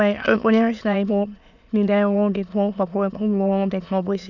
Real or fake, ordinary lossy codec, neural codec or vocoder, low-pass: fake; none; autoencoder, 22.05 kHz, a latent of 192 numbers a frame, VITS, trained on many speakers; 7.2 kHz